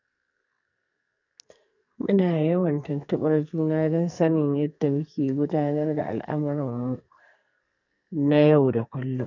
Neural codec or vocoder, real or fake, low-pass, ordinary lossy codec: codec, 44.1 kHz, 2.6 kbps, SNAC; fake; 7.2 kHz; none